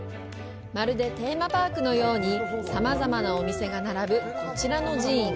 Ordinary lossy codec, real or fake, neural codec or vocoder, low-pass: none; real; none; none